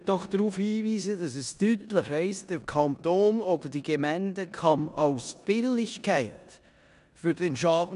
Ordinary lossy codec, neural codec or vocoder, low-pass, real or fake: none; codec, 16 kHz in and 24 kHz out, 0.9 kbps, LongCat-Audio-Codec, four codebook decoder; 10.8 kHz; fake